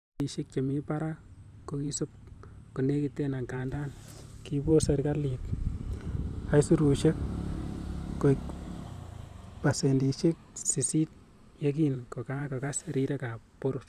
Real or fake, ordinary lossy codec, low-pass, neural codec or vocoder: fake; none; 14.4 kHz; vocoder, 44.1 kHz, 128 mel bands every 512 samples, BigVGAN v2